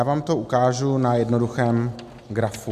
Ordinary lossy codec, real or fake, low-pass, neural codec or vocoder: MP3, 96 kbps; real; 14.4 kHz; none